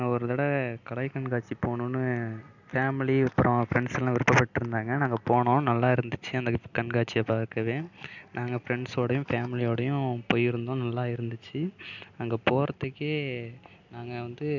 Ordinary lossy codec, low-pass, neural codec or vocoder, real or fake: none; 7.2 kHz; none; real